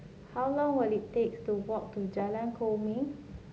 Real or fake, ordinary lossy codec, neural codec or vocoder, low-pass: real; none; none; none